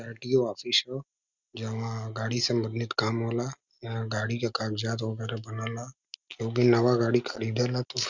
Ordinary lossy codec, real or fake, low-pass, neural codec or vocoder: Opus, 64 kbps; real; 7.2 kHz; none